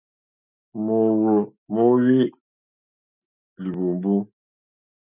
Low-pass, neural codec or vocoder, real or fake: 3.6 kHz; none; real